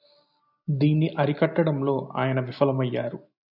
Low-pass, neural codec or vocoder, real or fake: 5.4 kHz; none; real